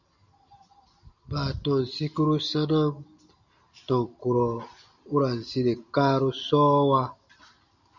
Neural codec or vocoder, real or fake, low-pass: none; real; 7.2 kHz